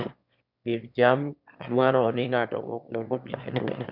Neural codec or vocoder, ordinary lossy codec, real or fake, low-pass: autoencoder, 22.05 kHz, a latent of 192 numbers a frame, VITS, trained on one speaker; none; fake; 5.4 kHz